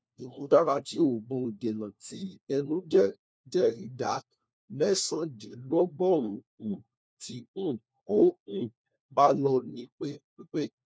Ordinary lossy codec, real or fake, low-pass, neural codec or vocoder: none; fake; none; codec, 16 kHz, 1 kbps, FunCodec, trained on LibriTTS, 50 frames a second